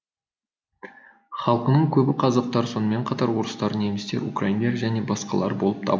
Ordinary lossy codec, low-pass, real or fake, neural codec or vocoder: none; none; real; none